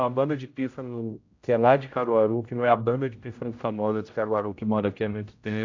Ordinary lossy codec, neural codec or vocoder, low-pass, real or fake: AAC, 48 kbps; codec, 16 kHz, 0.5 kbps, X-Codec, HuBERT features, trained on general audio; 7.2 kHz; fake